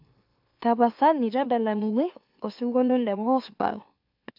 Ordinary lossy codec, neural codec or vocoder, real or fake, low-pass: AAC, 48 kbps; autoencoder, 44.1 kHz, a latent of 192 numbers a frame, MeloTTS; fake; 5.4 kHz